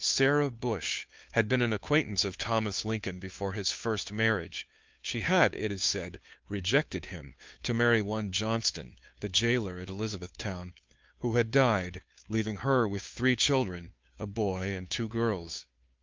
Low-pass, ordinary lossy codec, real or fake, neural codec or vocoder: 7.2 kHz; Opus, 24 kbps; fake; codec, 16 kHz, 2 kbps, FunCodec, trained on Chinese and English, 25 frames a second